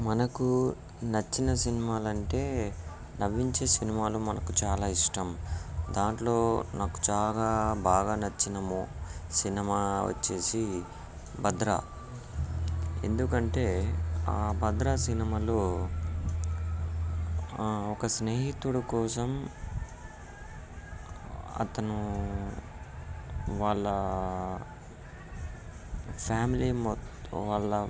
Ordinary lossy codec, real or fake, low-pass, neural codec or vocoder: none; real; none; none